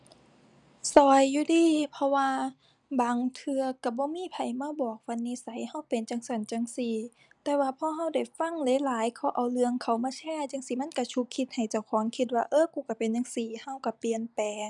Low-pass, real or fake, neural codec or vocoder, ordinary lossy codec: 10.8 kHz; real; none; none